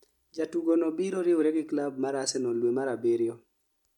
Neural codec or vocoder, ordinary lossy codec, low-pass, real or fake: none; MP3, 96 kbps; 19.8 kHz; real